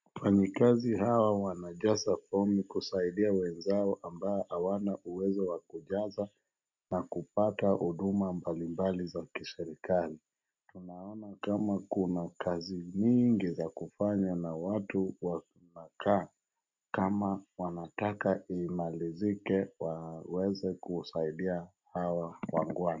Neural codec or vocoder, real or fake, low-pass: none; real; 7.2 kHz